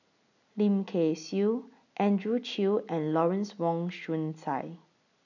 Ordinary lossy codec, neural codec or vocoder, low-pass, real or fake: none; none; 7.2 kHz; real